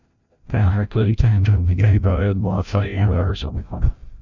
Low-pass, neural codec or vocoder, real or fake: 7.2 kHz; codec, 16 kHz, 0.5 kbps, FreqCodec, larger model; fake